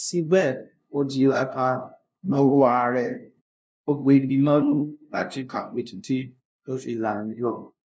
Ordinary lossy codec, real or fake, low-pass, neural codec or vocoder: none; fake; none; codec, 16 kHz, 0.5 kbps, FunCodec, trained on LibriTTS, 25 frames a second